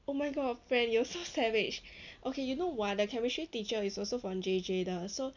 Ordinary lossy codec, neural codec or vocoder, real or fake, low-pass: none; none; real; 7.2 kHz